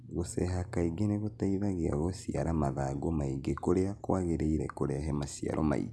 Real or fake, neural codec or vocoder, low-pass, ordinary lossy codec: real; none; none; none